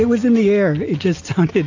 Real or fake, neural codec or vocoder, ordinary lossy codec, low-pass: real; none; AAC, 48 kbps; 7.2 kHz